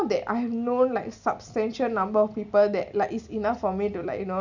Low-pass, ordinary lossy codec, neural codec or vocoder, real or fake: 7.2 kHz; none; none; real